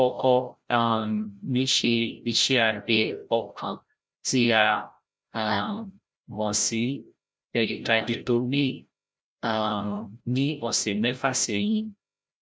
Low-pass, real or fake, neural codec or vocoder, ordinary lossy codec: none; fake; codec, 16 kHz, 0.5 kbps, FreqCodec, larger model; none